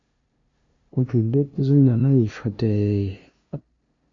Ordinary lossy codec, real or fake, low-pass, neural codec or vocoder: AAC, 48 kbps; fake; 7.2 kHz; codec, 16 kHz, 0.5 kbps, FunCodec, trained on LibriTTS, 25 frames a second